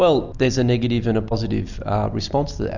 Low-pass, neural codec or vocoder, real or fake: 7.2 kHz; none; real